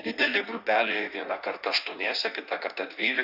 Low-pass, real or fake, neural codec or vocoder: 5.4 kHz; fake; codec, 16 kHz in and 24 kHz out, 1.1 kbps, FireRedTTS-2 codec